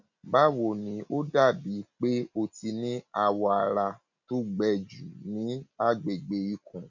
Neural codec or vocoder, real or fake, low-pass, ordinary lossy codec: none; real; 7.2 kHz; none